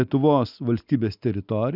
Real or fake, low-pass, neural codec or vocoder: real; 5.4 kHz; none